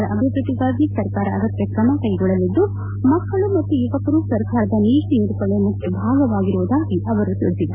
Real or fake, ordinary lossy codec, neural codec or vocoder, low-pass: real; MP3, 32 kbps; none; 3.6 kHz